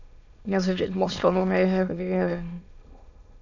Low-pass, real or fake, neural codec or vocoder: 7.2 kHz; fake; autoencoder, 22.05 kHz, a latent of 192 numbers a frame, VITS, trained on many speakers